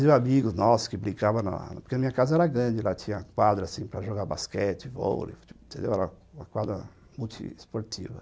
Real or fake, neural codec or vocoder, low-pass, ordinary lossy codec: real; none; none; none